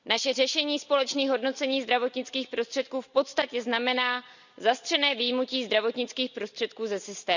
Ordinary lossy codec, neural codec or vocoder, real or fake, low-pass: none; none; real; 7.2 kHz